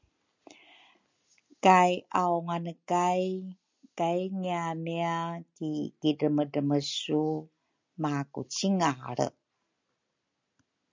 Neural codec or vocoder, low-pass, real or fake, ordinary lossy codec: none; 7.2 kHz; real; MP3, 64 kbps